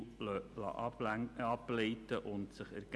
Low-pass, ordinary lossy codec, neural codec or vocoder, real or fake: none; none; none; real